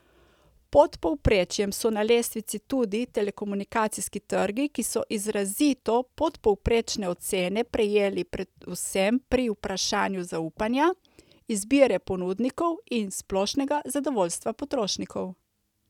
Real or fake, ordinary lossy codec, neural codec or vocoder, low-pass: real; none; none; 19.8 kHz